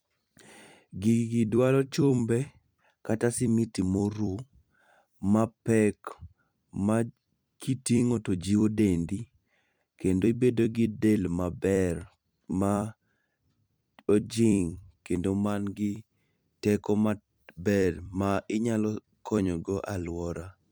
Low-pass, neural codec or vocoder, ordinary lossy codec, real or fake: none; vocoder, 44.1 kHz, 128 mel bands every 256 samples, BigVGAN v2; none; fake